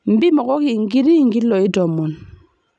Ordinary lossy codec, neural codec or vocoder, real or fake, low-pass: none; none; real; none